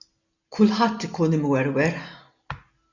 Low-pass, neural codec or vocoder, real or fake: 7.2 kHz; none; real